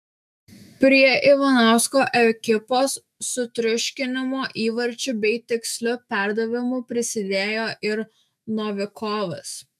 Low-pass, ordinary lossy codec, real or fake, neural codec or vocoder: 14.4 kHz; MP3, 96 kbps; fake; autoencoder, 48 kHz, 128 numbers a frame, DAC-VAE, trained on Japanese speech